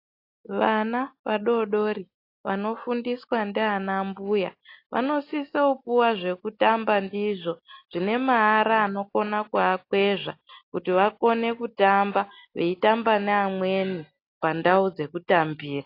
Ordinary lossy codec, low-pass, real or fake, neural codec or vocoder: AAC, 32 kbps; 5.4 kHz; real; none